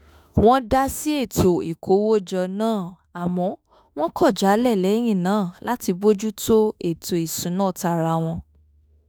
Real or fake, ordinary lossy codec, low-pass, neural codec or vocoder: fake; none; none; autoencoder, 48 kHz, 32 numbers a frame, DAC-VAE, trained on Japanese speech